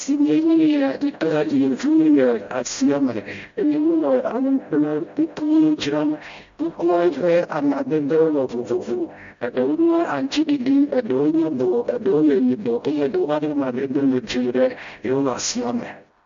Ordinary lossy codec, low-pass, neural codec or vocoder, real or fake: MP3, 48 kbps; 7.2 kHz; codec, 16 kHz, 0.5 kbps, FreqCodec, smaller model; fake